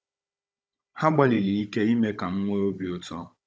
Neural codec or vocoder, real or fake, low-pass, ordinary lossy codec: codec, 16 kHz, 16 kbps, FunCodec, trained on Chinese and English, 50 frames a second; fake; none; none